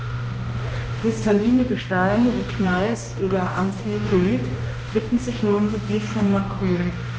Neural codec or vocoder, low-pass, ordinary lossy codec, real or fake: codec, 16 kHz, 1 kbps, X-Codec, HuBERT features, trained on balanced general audio; none; none; fake